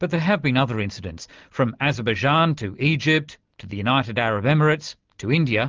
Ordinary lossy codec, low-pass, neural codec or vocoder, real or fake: Opus, 32 kbps; 7.2 kHz; none; real